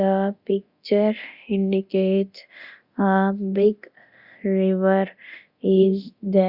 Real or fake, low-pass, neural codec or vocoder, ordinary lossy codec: fake; 5.4 kHz; codec, 24 kHz, 0.9 kbps, DualCodec; Opus, 64 kbps